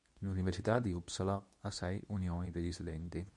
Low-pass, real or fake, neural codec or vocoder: 10.8 kHz; fake; codec, 24 kHz, 0.9 kbps, WavTokenizer, medium speech release version 2